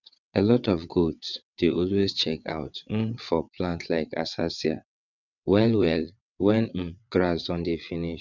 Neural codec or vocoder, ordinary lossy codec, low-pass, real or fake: vocoder, 22.05 kHz, 80 mel bands, WaveNeXt; none; 7.2 kHz; fake